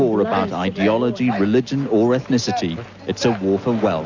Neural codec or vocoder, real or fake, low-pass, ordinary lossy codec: none; real; 7.2 kHz; Opus, 64 kbps